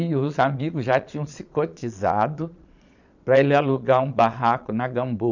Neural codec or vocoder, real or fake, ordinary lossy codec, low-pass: vocoder, 22.05 kHz, 80 mel bands, Vocos; fake; none; 7.2 kHz